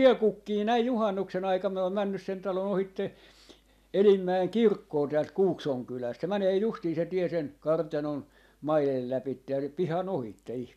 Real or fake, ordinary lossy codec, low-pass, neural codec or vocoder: real; none; 14.4 kHz; none